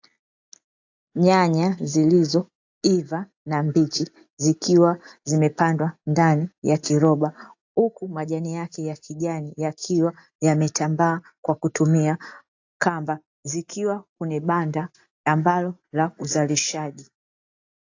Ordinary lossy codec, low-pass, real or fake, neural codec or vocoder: AAC, 48 kbps; 7.2 kHz; real; none